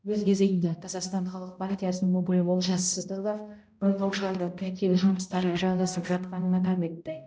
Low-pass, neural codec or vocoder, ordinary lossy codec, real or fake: none; codec, 16 kHz, 0.5 kbps, X-Codec, HuBERT features, trained on balanced general audio; none; fake